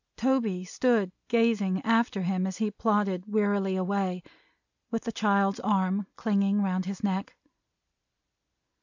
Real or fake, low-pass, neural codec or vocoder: real; 7.2 kHz; none